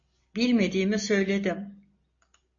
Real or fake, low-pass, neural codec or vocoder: real; 7.2 kHz; none